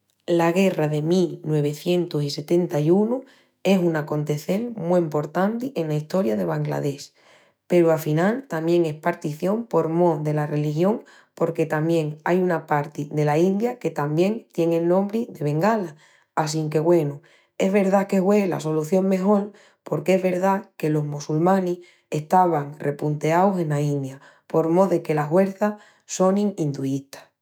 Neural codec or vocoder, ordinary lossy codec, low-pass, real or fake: autoencoder, 48 kHz, 128 numbers a frame, DAC-VAE, trained on Japanese speech; none; none; fake